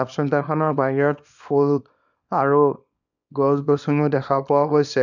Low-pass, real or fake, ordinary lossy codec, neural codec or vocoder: 7.2 kHz; fake; none; codec, 24 kHz, 0.9 kbps, WavTokenizer, small release